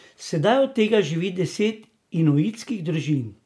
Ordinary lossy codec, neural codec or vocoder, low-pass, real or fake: none; none; none; real